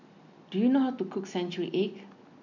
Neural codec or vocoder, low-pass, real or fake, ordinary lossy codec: none; 7.2 kHz; real; none